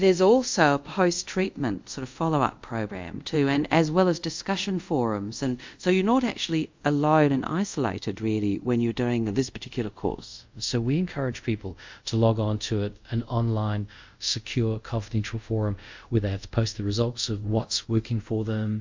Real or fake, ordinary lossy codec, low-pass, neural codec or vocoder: fake; MP3, 64 kbps; 7.2 kHz; codec, 24 kHz, 0.5 kbps, DualCodec